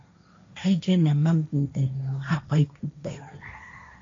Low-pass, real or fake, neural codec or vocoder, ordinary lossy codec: 7.2 kHz; fake; codec, 16 kHz, 1.1 kbps, Voila-Tokenizer; AAC, 48 kbps